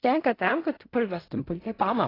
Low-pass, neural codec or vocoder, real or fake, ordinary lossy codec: 5.4 kHz; codec, 16 kHz in and 24 kHz out, 0.4 kbps, LongCat-Audio-Codec, fine tuned four codebook decoder; fake; AAC, 24 kbps